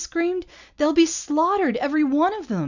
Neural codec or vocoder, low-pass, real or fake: none; 7.2 kHz; real